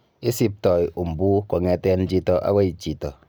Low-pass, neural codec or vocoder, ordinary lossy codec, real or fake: none; none; none; real